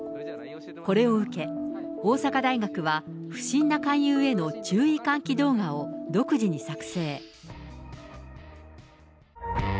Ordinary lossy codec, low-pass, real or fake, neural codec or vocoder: none; none; real; none